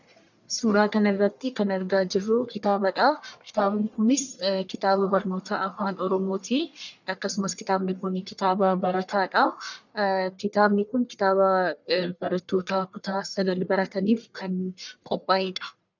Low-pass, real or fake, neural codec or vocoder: 7.2 kHz; fake; codec, 44.1 kHz, 1.7 kbps, Pupu-Codec